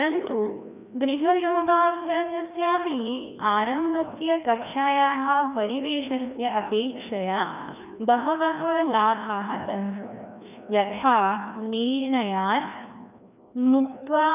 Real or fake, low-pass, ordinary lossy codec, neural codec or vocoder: fake; 3.6 kHz; none; codec, 16 kHz, 1 kbps, FreqCodec, larger model